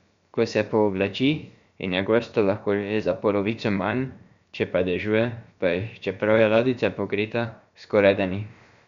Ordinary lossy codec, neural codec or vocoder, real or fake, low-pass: MP3, 64 kbps; codec, 16 kHz, about 1 kbps, DyCAST, with the encoder's durations; fake; 7.2 kHz